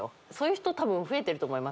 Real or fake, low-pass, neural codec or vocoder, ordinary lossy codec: real; none; none; none